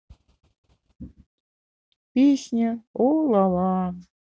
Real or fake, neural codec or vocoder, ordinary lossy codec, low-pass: real; none; none; none